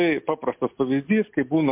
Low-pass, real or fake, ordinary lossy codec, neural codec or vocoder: 3.6 kHz; real; MP3, 32 kbps; none